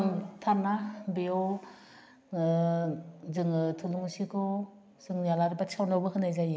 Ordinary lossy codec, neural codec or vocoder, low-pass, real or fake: none; none; none; real